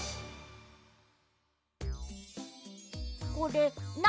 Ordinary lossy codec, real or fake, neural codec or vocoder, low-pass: none; real; none; none